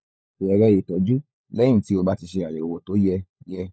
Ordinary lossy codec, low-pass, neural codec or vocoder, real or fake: none; none; codec, 16 kHz, 16 kbps, FunCodec, trained on LibriTTS, 50 frames a second; fake